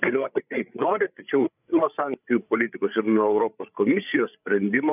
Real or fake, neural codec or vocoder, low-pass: fake; codec, 16 kHz, 8 kbps, FreqCodec, larger model; 3.6 kHz